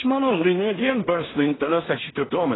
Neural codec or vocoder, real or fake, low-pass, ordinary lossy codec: codec, 16 kHz in and 24 kHz out, 0.4 kbps, LongCat-Audio-Codec, two codebook decoder; fake; 7.2 kHz; AAC, 16 kbps